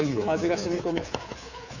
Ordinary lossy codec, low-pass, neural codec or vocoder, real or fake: none; 7.2 kHz; codec, 24 kHz, 3.1 kbps, DualCodec; fake